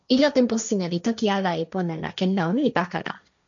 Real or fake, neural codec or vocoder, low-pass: fake; codec, 16 kHz, 1.1 kbps, Voila-Tokenizer; 7.2 kHz